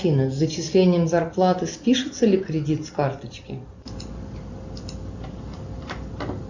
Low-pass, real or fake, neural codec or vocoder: 7.2 kHz; real; none